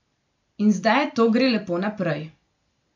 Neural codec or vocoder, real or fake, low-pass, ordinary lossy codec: none; real; 7.2 kHz; none